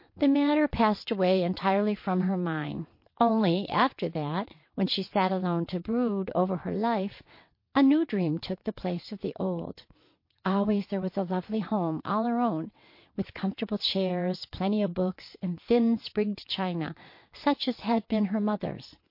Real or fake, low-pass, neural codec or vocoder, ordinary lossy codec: fake; 5.4 kHz; vocoder, 22.05 kHz, 80 mel bands, WaveNeXt; MP3, 32 kbps